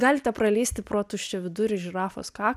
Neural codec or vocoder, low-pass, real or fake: none; 14.4 kHz; real